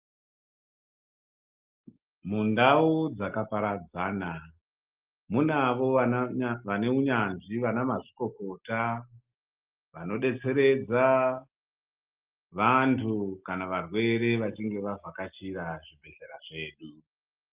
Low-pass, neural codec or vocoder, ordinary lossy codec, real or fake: 3.6 kHz; none; Opus, 24 kbps; real